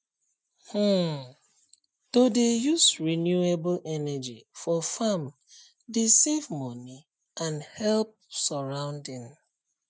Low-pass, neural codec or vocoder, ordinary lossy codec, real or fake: none; none; none; real